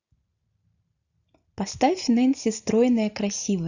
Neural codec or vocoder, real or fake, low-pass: none; real; 7.2 kHz